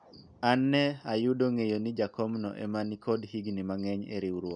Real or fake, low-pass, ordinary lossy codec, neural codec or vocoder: real; 9.9 kHz; none; none